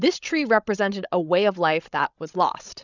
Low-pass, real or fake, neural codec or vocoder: 7.2 kHz; fake; codec, 16 kHz, 16 kbps, FreqCodec, larger model